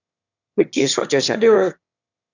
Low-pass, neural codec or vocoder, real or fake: 7.2 kHz; autoencoder, 22.05 kHz, a latent of 192 numbers a frame, VITS, trained on one speaker; fake